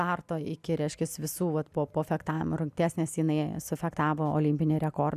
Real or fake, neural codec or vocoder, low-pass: real; none; 14.4 kHz